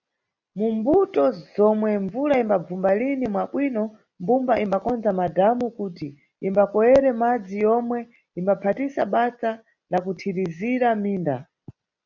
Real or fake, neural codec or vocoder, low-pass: real; none; 7.2 kHz